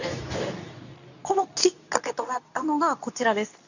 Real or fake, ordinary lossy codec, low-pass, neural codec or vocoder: fake; none; 7.2 kHz; codec, 24 kHz, 0.9 kbps, WavTokenizer, medium speech release version 2